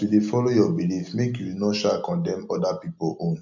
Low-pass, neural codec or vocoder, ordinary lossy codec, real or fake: 7.2 kHz; none; MP3, 64 kbps; real